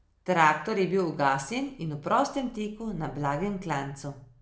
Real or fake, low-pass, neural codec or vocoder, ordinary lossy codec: real; none; none; none